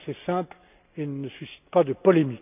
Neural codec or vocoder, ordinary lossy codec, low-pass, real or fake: none; none; 3.6 kHz; real